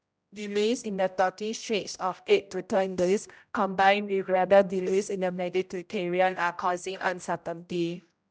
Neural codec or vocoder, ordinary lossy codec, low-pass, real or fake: codec, 16 kHz, 0.5 kbps, X-Codec, HuBERT features, trained on general audio; none; none; fake